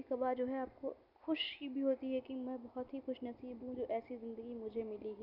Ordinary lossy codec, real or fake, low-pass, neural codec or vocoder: none; real; 5.4 kHz; none